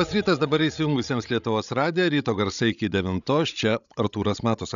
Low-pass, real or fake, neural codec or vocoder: 7.2 kHz; fake; codec, 16 kHz, 16 kbps, FreqCodec, larger model